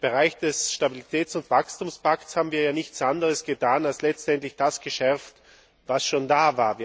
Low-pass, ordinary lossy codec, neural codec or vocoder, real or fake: none; none; none; real